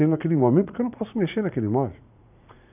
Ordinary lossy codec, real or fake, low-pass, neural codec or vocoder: none; fake; 3.6 kHz; vocoder, 44.1 kHz, 80 mel bands, Vocos